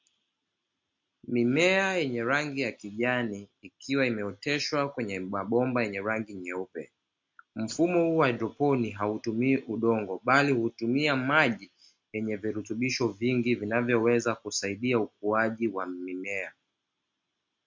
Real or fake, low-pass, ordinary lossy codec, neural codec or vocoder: real; 7.2 kHz; MP3, 48 kbps; none